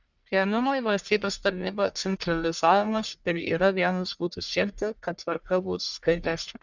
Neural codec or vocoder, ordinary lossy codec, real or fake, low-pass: codec, 44.1 kHz, 1.7 kbps, Pupu-Codec; Opus, 64 kbps; fake; 7.2 kHz